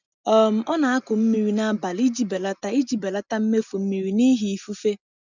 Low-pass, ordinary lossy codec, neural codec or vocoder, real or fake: 7.2 kHz; none; none; real